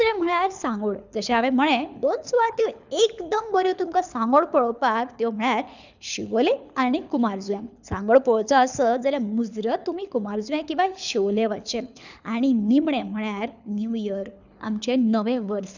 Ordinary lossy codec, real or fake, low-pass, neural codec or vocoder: none; fake; 7.2 kHz; codec, 24 kHz, 6 kbps, HILCodec